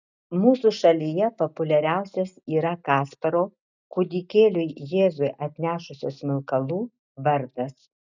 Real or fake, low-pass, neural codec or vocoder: real; 7.2 kHz; none